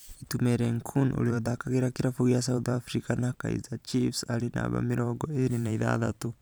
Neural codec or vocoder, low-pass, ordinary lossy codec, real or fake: vocoder, 44.1 kHz, 128 mel bands every 512 samples, BigVGAN v2; none; none; fake